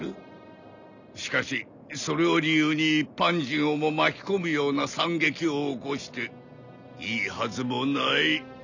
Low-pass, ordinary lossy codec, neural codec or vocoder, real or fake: 7.2 kHz; none; none; real